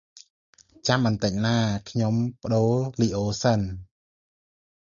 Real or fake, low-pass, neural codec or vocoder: real; 7.2 kHz; none